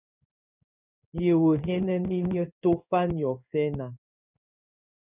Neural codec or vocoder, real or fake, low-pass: codec, 16 kHz in and 24 kHz out, 1 kbps, XY-Tokenizer; fake; 3.6 kHz